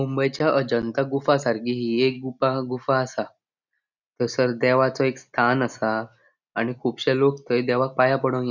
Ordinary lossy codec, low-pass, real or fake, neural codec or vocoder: none; 7.2 kHz; real; none